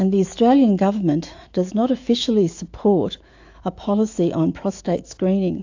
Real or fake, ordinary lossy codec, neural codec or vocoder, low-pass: fake; AAC, 48 kbps; autoencoder, 48 kHz, 128 numbers a frame, DAC-VAE, trained on Japanese speech; 7.2 kHz